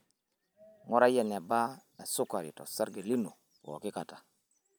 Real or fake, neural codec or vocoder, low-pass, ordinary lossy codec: real; none; none; none